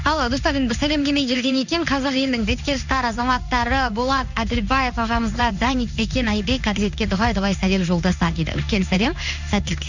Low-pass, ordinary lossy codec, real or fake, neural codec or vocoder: 7.2 kHz; none; fake; codec, 16 kHz in and 24 kHz out, 1 kbps, XY-Tokenizer